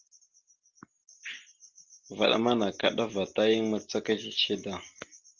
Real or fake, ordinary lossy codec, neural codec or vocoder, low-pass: real; Opus, 32 kbps; none; 7.2 kHz